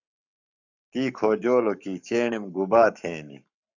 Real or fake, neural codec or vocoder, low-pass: fake; codec, 44.1 kHz, 7.8 kbps, Pupu-Codec; 7.2 kHz